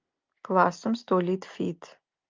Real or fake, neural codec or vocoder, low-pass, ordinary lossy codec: real; none; 7.2 kHz; Opus, 24 kbps